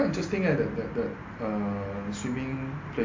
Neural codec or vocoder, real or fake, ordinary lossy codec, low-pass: none; real; MP3, 48 kbps; 7.2 kHz